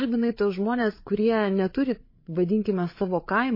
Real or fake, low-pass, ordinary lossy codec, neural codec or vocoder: fake; 5.4 kHz; MP3, 24 kbps; codec, 16 kHz, 8 kbps, FreqCodec, larger model